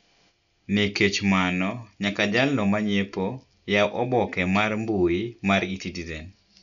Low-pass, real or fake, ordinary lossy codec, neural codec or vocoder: 7.2 kHz; real; none; none